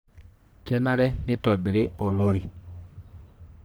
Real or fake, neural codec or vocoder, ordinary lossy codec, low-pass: fake; codec, 44.1 kHz, 1.7 kbps, Pupu-Codec; none; none